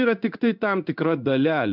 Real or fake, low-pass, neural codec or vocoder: fake; 5.4 kHz; codec, 16 kHz in and 24 kHz out, 1 kbps, XY-Tokenizer